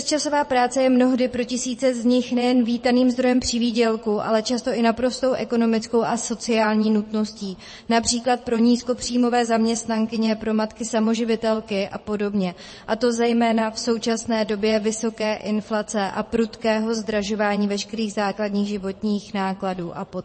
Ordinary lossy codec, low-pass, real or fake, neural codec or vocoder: MP3, 32 kbps; 9.9 kHz; fake; vocoder, 22.05 kHz, 80 mel bands, WaveNeXt